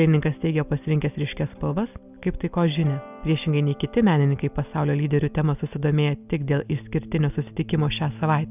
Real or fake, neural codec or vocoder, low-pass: real; none; 3.6 kHz